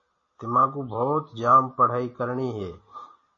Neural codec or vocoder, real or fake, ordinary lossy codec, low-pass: none; real; MP3, 32 kbps; 7.2 kHz